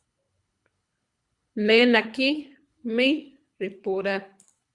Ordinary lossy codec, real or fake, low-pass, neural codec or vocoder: Opus, 64 kbps; fake; 10.8 kHz; codec, 24 kHz, 3 kbps, HILCodec